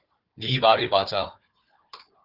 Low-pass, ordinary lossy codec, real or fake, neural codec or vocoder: 5.4 kHz; Opus, 32 kbps; fake; codec, 16 kHz, 4 kbps, FunCodec, trained on Chinese and English, 50 frames a second